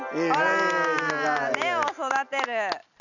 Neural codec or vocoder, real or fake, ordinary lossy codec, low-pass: none; real; none; 7.2 kHz